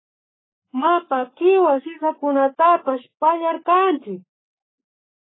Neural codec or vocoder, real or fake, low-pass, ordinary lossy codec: codec, 44.1 kHz, 7.8 kbps, Pupu-Codec; fake; 7.2 kHz; AAC, 16 kbps